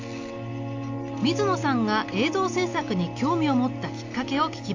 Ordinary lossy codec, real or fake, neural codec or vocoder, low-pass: AAC, 48 kbps; real; none; 7.2 kHz